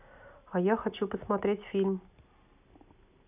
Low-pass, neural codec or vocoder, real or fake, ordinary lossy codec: 3.6 kHz; none; real; none